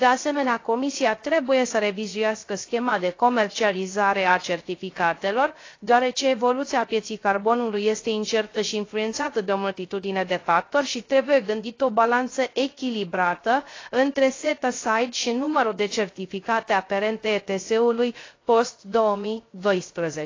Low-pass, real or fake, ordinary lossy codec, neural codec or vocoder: 7.2 kHz; fake; AAC, 32 kbps; codec, 16 kHz, 0.3 kbps, FocalCodec